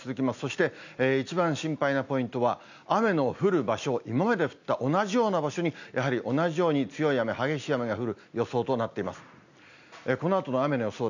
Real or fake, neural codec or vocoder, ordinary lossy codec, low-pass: real; none; none; 7.2 kHz